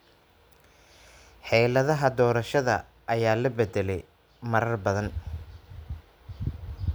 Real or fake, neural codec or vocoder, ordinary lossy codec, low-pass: real; none; none; none